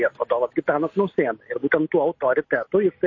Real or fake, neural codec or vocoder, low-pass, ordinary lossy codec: real; none; 7.2 kHz; MP3, 32 kbps